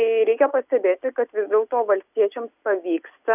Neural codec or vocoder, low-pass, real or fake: none; 3.6 kHz; real